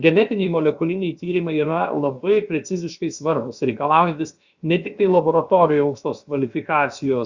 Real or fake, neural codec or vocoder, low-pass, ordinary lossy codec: fake; codec, 16 kHz, about 1 kbps, DyCAST, with the encoder's durations; 7.2 kHz; Opus, 64 kbps